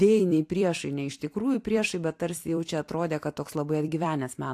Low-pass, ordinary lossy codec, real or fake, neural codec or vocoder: 14.4 kHz; AAC, 64 kbps; fake; vocoder, 44.1 kHz, 128 mel bands every 256 samples, BigVGAN v2